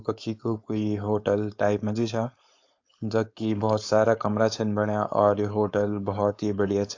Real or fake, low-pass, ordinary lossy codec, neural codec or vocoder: fake; 7.2 kHz; AAC, 48 kbps; codec, 16 kHz, 4.8 kbps, FACodec